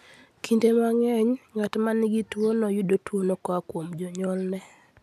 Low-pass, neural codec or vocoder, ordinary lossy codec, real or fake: 14.4 kHz; none; none; real